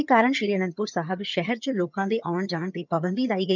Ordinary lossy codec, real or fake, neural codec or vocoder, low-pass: none; fake; vocoder, 22.05 kHz, 80 mel bands, HiFi-GAN; 7.2 kHz